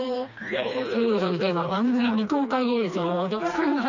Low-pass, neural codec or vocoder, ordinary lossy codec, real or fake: 7.2 kHz; codec, 16 kHz, 2 kbps, FreqCodec, smaller model; none; fake